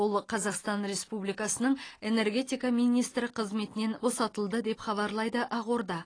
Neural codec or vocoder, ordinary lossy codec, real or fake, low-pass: none; AAC, 32 kbps; real; 9.9 kHz